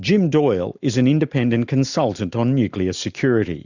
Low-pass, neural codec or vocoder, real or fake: 7.2 kHz; none; real